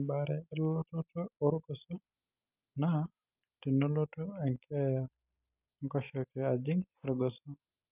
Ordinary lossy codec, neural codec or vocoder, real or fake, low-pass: none; none; real; 3.6 kHz